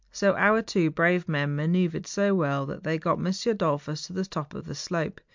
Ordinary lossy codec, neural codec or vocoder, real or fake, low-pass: MP3, 64 kbps; none; real; 7.2 kHz